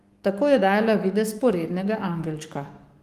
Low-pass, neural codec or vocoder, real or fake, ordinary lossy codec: 14.4 kHz; codec, 44.1 kHz, 7.8 kbps, DAC; fake; Opus, 24 kbps